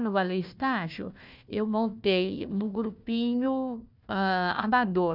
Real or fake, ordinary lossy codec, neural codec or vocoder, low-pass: fake; none; codec, 16 kHz, 1 kbps, FunCodec, trained on Chinese and English, 50 frames a second; 5.4 kHz